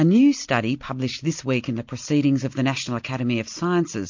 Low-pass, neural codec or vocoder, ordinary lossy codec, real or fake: 7.2 kHz; none; MP3, 48 kbps; real